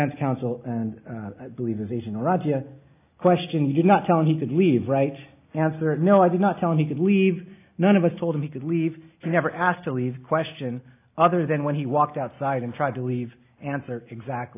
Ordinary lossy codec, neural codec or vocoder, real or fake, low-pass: AAC, 24 kbps; none; real; 3.6 kHz